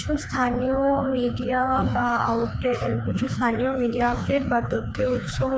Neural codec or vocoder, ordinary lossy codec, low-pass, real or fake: codec, 16 kHz, 2 kbps, FreqCodec, larger model; none; none; fake